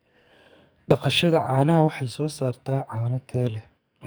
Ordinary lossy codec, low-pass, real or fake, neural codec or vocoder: none; none; fake; codec, 44.1 kHz, 2.6 kbps, SNAC